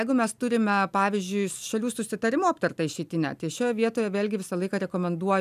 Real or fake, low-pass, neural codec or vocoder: real; 14.4 kHz; none